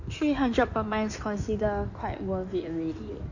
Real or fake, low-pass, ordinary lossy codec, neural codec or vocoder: fake; 7.2 kHz; none; codec, 16 kHz in and 24 kHz out, 2.2 kbps, FireRedTTS-2 codec